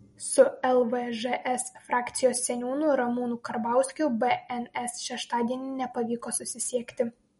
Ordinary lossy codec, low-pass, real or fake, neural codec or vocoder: MP3, 48 kbps; 19.8 kHz; real; none